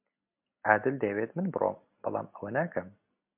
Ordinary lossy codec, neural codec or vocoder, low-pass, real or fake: MP3, 32 kbps; none; 3.6 kHz; real